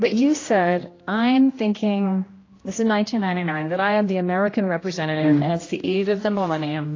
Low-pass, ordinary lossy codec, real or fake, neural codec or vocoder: 7.2 kHz; AAC, 32 kbps; fake; codec, 16 kHz, 1 kbps, X-Codec, HuBERT features, trained on general audio